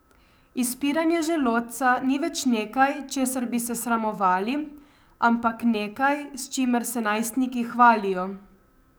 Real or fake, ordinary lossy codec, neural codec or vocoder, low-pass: fake; none; codec, 44.1 kHz, 7.8 kbps, DAC; none